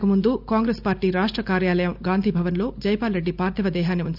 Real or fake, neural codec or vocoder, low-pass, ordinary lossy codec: real; none; 5.4 kHz; none